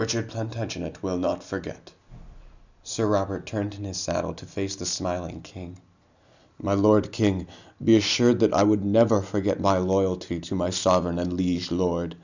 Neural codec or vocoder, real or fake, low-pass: none; real; 7.2 kHz